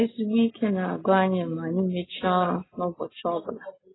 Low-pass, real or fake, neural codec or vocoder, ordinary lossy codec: 7.2 kHz; real; none; AAC, 16 kbps